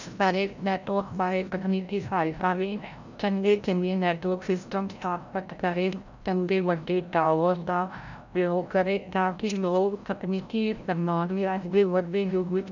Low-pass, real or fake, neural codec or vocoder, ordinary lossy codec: 7.2 kHz; fake; codec, 16 kHz, 0.5 kbps, FreqCodec, larger model; none